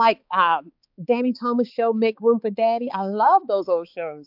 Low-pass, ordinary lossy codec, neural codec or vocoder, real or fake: 5.4 kHz; AAC, 48 kbps; codec, 16 kHz, 4 kbps, X-Codec, HuBERT features, trained on balanced general audio; fake